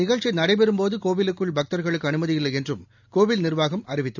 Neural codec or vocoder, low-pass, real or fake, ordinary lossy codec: none; 7.2 kHz; real; none